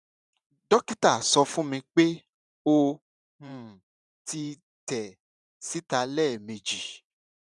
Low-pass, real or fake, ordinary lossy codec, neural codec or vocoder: 10.8 kHz; fake; none; vocoder, 44.1 kHz, 128 mel bands every 512 samples, BigVGAN v2